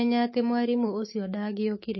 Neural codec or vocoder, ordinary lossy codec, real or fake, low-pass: autoencoder, 48 kHz, 128 numbers a frame, DAC-VAE, trained on Japanese speech; MP3, 24 kbps; fake; 7.2 kHz